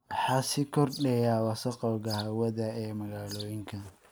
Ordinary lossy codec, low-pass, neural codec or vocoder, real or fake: none; none; none; real